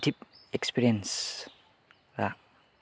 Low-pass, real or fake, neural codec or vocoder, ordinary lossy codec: none; real; none; none